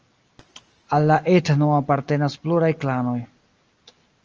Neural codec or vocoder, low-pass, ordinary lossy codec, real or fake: none; 7.2 kHz; Opus, 24 kbps; real